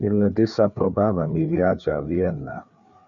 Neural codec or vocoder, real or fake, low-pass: codec, 16 kHz, 4 kbps, FreqCodec, larger model; fake; 7.2 kHz